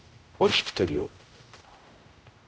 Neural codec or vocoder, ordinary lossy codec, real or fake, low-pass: codec, 16 kHz, 0.5 kbps, X-Codec, HuBERT features, trained on general audio; none; fake; none